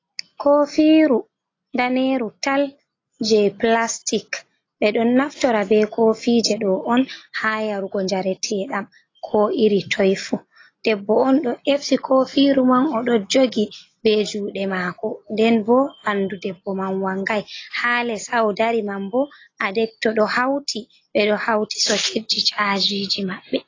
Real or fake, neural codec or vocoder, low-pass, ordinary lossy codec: real; none; 7.2 kHz; AAC, 32 kbps